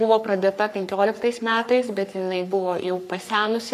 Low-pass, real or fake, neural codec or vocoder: 14.4 kHz; fake; codec, 44.1 kHz, 3.4 kbps, Pupu-Codec